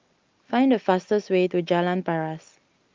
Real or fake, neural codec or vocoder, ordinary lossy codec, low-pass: real; none; Opus, 24 kbps; 7.2 kHz